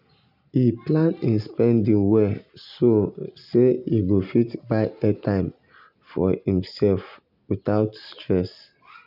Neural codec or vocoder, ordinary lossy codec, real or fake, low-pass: none; none; real; 5.4 kHz